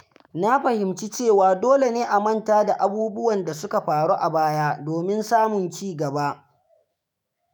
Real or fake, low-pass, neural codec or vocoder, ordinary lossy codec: fake; none; autoencoder, 48 kHz, 128 numbers a frame, DAC-VAE, trained on Japanese speech; none